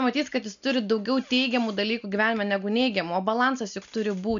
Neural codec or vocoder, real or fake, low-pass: none; real; 7.2 kHz